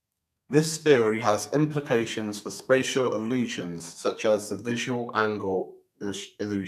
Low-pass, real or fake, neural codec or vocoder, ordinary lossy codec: 14.4 kHz; fake; codec, 32 kHz, 1.9 kbps, SNAC; none